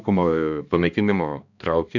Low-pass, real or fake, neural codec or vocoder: 7.2 kHz; fake; codec, 16 kHz, 2 kbps, X-Codec, HuBERT features, trained on balanced general audio